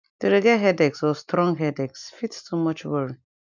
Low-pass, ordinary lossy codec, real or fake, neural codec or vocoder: 7.2 kHz; none; real; none